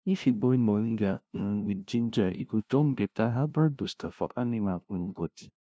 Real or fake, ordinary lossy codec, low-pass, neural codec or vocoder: fake; none; none; codec, 16 kHz, 0.5 kbps, FunCodec, trained on LibriTTS, 25 frames a second